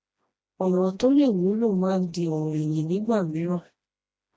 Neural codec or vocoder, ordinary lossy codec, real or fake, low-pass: codec, 16 kHz, 1 kbps, FreqCodec, smaller model; none; fake; none